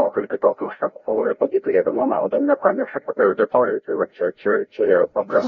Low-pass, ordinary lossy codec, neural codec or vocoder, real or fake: 7.2 kHz; AAC, 32 kbps; codec, 16 kHz, 0.5 kbps, FreqCodec, larger model; fake